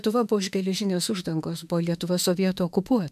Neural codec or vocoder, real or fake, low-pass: autoencoder, 48 kHz, 32 numbers a frame, DAC-VAE, trained on Japanese speech; fake; 14.4 kHz